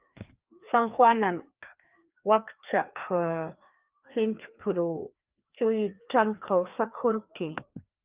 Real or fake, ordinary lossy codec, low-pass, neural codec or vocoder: fake; Opus, 24 kbps; 3.6 kHz; codec, 16 kHz, 2 kbps, FreqCodec, larger model